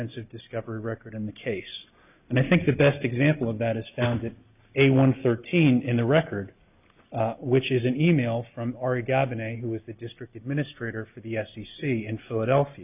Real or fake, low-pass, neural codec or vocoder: real; 3.6 kHz; none